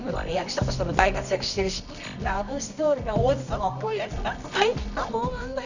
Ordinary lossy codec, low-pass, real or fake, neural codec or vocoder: none; 7.2 kHz; fake; codec, 24 kHz, 0.9 kbps, WavTokenizer, medium music audio release